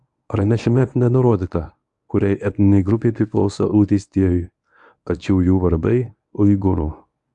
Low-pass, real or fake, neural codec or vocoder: 10.8 kHz; fake; codec, 24 kHz, 0.9 kbps, WavTokenizer, medium speech release version 1